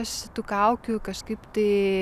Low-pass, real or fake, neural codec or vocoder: 14.4 kHz; real; none